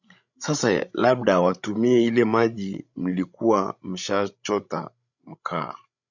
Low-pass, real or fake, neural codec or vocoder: 7.2 kHz; fake; codec, 16 kHz, 16 kbps, FreqCodec, larger model